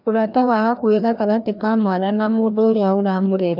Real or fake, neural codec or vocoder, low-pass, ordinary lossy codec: fake; codec, 16 kHz, 1 kbps, FreqCodec, larger model; 5.4 kHz; none